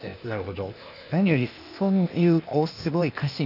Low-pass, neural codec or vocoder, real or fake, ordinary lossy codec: 5.4 kHz; codec, 16 kHz, 0.8 kbps, ZipCodec; fake; none